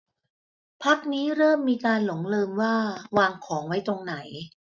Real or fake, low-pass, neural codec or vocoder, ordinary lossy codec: real; 7.2 kHz; none; none